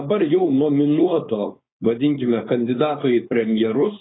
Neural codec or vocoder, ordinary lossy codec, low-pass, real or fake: codec, 16 kHz, 4.8 kbps, FACodec; AAC, 16 kbps; 7.2 kHz; fake